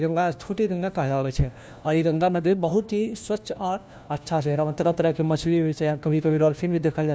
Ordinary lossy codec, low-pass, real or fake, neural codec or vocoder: none; none; fake; codec, 16 kHz, 1 kbps, FunCodec, trained on LibriTTS, 50 frames a second